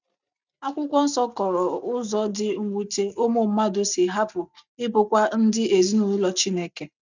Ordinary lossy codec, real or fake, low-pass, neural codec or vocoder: none; real; 7.2 kHz; none